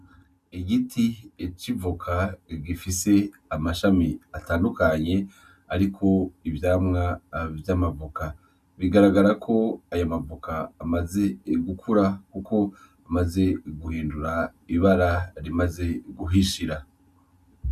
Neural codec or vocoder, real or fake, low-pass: none; real; 14.4 kHz